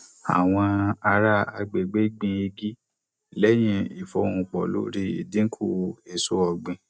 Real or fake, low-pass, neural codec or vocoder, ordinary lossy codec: real; none; none; none